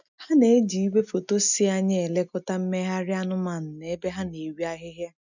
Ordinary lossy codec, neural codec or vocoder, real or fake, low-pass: none; none; real; 7.2 kHz